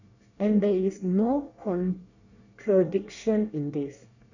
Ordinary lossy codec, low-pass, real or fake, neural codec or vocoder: none; 7.2 kHz; fake; codec, 24 kHz, 1 kbps, SNAC